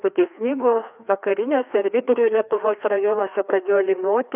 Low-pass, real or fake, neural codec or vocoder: 3.6 kHz; fake; codec, 16 kHz, 2 kbps, FreqCodec, larger model